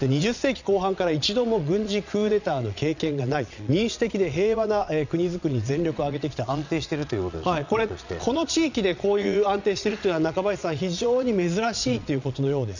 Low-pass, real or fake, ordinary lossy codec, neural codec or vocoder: 7.2 kHz; fake; none; vocoder, 22.05 kHz, 80 mel bands, Vocos